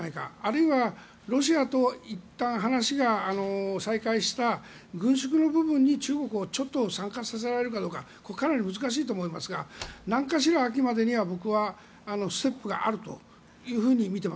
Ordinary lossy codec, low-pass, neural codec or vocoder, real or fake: none; none; none; real